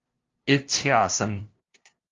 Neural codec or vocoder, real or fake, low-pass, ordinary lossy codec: codec, 16 kHz, 0.5 kbps, FunCodec, trained on LibriTTS, 25 frames a second; fake; 7.2 kHz; Opus, 32 kbps